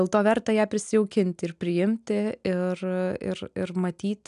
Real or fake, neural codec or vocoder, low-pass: real; none; 10.8 kHz